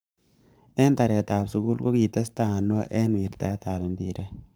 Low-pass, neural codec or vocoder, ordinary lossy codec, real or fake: none; codec, 44.1 kHz, 7.8 kbps, Pupu-Codec; none; fake